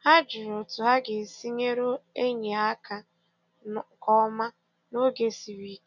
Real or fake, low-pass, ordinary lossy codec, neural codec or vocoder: real; 7.2 kHz; none; none